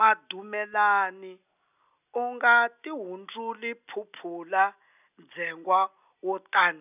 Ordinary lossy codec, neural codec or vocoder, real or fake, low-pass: none; none; real; 3.6 kHz